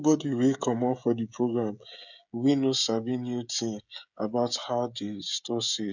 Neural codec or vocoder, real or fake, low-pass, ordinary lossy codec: codec, 16 kHz, 16 kbps, FreqCodec, smaller model; fake; 7.2 kHz; none